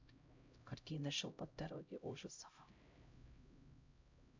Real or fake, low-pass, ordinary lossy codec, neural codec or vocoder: fake; 7.2 kHz; MP3, 64 kbps; codec, 16 kHz, 0.5 kbps, X-Codec, HuBERT features, trained on LibriSpeech